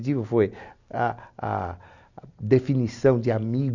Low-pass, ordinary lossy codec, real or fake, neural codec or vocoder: 7.2 kHz; none; real; none